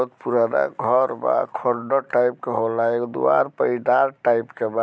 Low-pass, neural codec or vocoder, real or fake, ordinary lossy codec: none; none; real; none